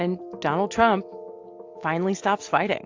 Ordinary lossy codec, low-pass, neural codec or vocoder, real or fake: AAC, 48 kbps; 7.2 kHz; none; real